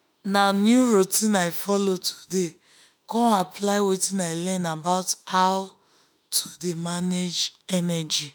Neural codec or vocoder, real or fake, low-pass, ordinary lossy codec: autoencoder, 48 kHz, 32 numbers a frame, DAC-VAE, trained on Japanese speech; fake; none; none